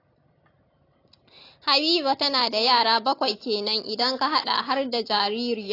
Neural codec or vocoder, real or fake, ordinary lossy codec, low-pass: none; real; AAC, 32 kbps; 5.4 kHz